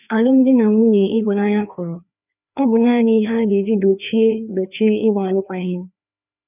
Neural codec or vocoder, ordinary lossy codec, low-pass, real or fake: codec, 16 kHz, 2 kbps, FreqCodec, larger model; none; 3.6 kHz; fake